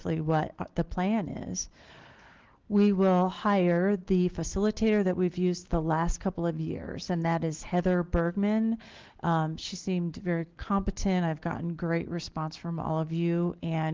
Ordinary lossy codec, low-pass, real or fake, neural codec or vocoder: Opus, 16 kbps; 7.2 kHz; real; none